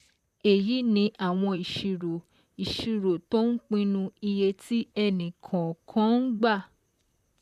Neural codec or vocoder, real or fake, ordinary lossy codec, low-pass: vocoder, 44.1 kHz, 128 mel bands, Pupu-Vocoder; fake; none; 14.4 kHz